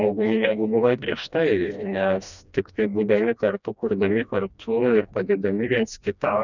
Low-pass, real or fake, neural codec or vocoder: 7.2 kHz; fake; codec, 16 kHz, 1 kbps, FreqCodec, smaller model